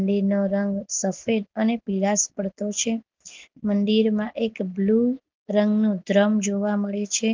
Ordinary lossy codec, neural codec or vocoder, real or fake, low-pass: Opus, 32 kbps; none; real; 7.2 kHz